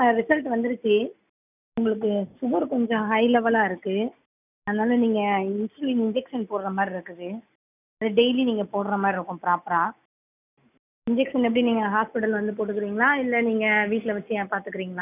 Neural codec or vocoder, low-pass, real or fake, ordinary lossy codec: none; 3.6 kHz; real; none